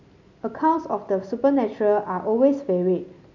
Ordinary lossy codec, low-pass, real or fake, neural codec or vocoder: none; 7.2 kHz; real; none